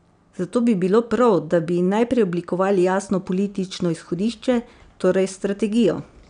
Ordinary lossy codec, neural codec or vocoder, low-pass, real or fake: none; none; 9.9 kHz; real